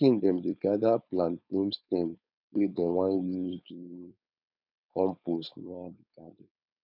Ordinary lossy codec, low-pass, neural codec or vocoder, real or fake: none; 5.4 kHz; codec, 16 kHz, 4.8 kbps, FACodec; fake